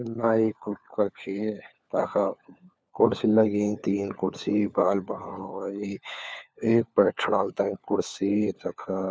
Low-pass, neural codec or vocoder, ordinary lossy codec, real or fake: none; codec, 16 kHz, 4 kbps, FunCodec, trained on LibriTTS, 50 frames a second; none; fake